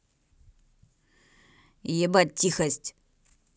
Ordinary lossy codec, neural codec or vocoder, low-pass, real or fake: none; none; none; real